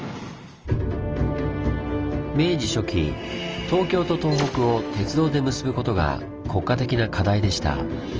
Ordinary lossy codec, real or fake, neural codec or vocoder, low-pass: Opus, 24 kbps; real; none; 7.2 kHz